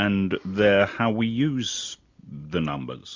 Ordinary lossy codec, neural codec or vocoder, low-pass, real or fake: MP3, 64 kbps; none; 7.2 kHz; real